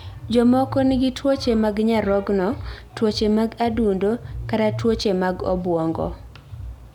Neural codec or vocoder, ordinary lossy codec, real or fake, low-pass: none; none; real; 19.8 kHz